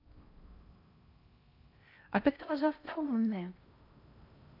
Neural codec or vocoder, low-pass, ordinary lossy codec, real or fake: codec, 16 kHz in and 24 kHz out, 0.6 kbps, FocalCodec, streaming, 4096 codes; 5.4 kHz; none; fake